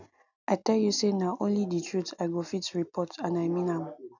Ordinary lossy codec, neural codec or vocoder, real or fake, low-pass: none; none; real; 7.2 kHz